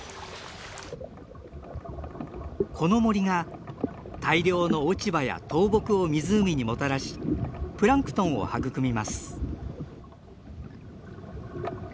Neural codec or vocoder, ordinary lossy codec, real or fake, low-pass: none; none; real; none